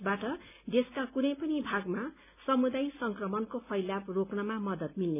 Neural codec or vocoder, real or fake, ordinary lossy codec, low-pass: none; real; none; 3.6 kHz